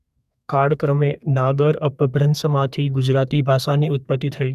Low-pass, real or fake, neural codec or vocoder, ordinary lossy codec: 14.4 kHz; fake; codec, 32 kHz, 1.9 kbps, SNAC; none